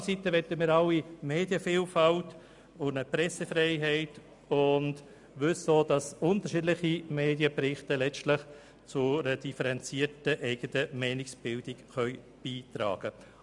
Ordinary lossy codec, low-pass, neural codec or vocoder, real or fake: none; 10.8 kHz; none; real